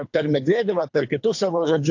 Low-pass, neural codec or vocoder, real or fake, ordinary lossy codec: 7.2 kHz; codec, 24 kHz, 3 kbps, HILCodec; fake; AAC, 48 kbps